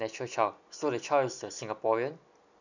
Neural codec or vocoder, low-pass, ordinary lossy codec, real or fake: none; 7.2 kHz; none; real